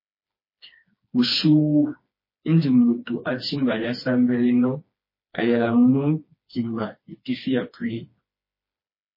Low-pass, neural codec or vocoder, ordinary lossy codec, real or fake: 5.4 kHz; codec, 16 kHz, 2 kbps, FreqCodec, smaller model; MP3, 24 kbps; fake